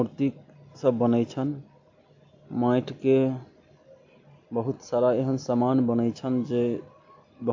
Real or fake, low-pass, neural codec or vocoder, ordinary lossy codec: real; 7.2 kHz; none; AAC, 48 kbps